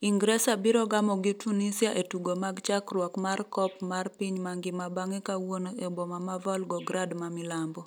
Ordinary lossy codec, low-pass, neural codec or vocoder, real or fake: none; none; none; real